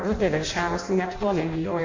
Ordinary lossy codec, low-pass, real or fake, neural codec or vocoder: MP3, 48 kbps; 7.2 kHz; fake; codec, 16 kHz in and 24 kHz out, 0.6 kbps, FireRedTTS-2 codec